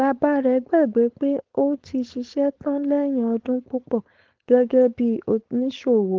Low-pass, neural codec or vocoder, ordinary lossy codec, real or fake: 7.2 kHz; codec, 16 kHz, 4.8 kbps, FACodec; Opus, 16 kbps; fake